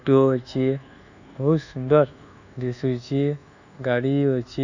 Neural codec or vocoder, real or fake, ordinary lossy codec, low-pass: codec, 24 kHz, 1.2 kbps, DualCodec; fake; none; 7.2 kHz